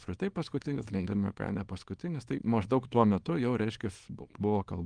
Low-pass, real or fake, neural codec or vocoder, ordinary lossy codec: 9.9 kHz; fake; codec, 24 kHz, 0.9 kbps, WavTokenizer, small release; Opus, 24 kbps